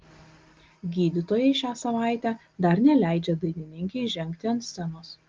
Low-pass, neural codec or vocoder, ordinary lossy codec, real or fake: 7.2 kHz; none; Opus, 32 kbps; real